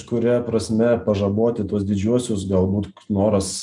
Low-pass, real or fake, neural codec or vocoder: 10.8 kHz; real; none